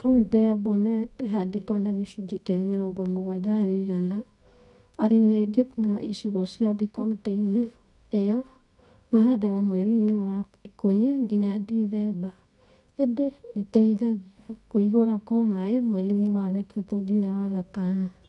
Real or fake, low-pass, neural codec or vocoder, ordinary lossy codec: fake; 10.8 kHz; codec, 24 kHz, 0.9 kbps, WavTokenizer, medium music audio release; none